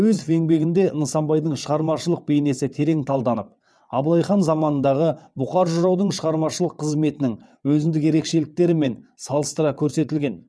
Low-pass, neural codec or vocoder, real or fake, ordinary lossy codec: none; vocoder, 22.05 kHz, 80 mel bands, WaveNeXt; fake; none